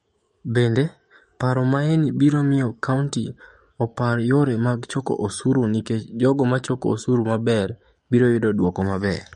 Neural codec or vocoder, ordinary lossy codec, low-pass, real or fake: codec, 44.1 kHz, 7.8 kbps, DAC; MP3, 48 kbps; 19.8 kHz; fake